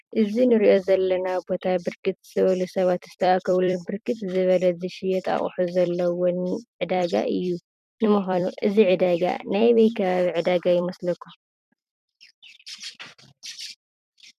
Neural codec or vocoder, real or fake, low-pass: vocoder, 44.1 kHz, 128 mel bands every 256 samples, BigVGAN v2; fake; 14.4 kHz